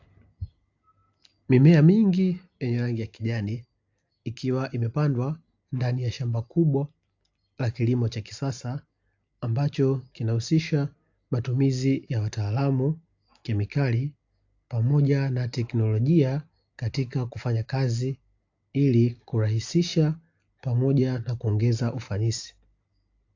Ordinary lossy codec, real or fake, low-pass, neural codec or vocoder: AAC, 48 kbps; real; 7.2 kHz; none